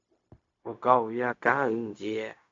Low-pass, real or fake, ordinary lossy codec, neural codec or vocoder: 7.2 kHz; fake; AAC, 48 kbps; codec, 16 kHz, 0.4 kbps, LongCat-Audio-Codec